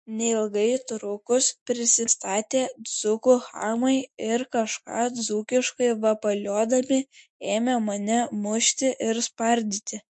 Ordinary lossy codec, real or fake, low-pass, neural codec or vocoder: MP3, 48 kbps; real; 10.8 kHz; none